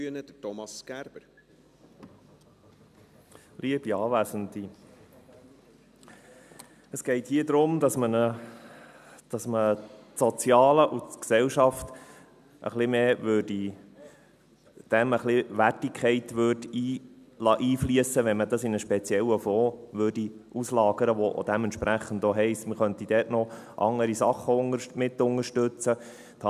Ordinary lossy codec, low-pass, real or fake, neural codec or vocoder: none; 14.4 kHz; real; none